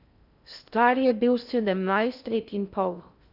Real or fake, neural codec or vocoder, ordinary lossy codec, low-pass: fake; codec, 16 kHz in and 24 kHz out, 0.6 kbps, FocalCodec, streaming, 2048 codes; none; 5.4 kHz